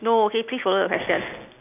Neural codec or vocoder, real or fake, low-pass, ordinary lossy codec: none; real; 3.6 kHz; none